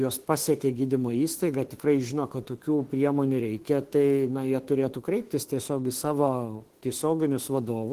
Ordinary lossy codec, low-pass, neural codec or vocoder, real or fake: Opus, 16 kbps; 14.4 kHz; autoencoder, 48 kHz, 32 numbers a frame, DAC-VAE, trained on Japanese speech; fake